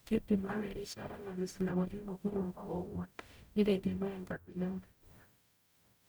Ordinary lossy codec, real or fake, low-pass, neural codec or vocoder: none; fake; none; codec, 44.1 kHz, 0.9 kbps, DAC